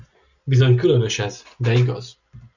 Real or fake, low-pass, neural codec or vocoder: real; 7.2 kHz; none